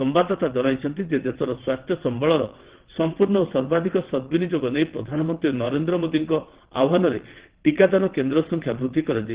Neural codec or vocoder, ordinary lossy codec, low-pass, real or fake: vocoder, 22.05 kHz, 80 mel bands, WaveNeXt; Opus, 16 kbps; 3.6 kHz; fake